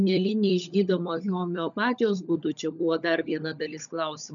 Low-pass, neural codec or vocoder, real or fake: 7.2 kHz; codec, 16 kHz, 16 kbps, FunCodec, trained on LibriTTS, 50 frames a second; fake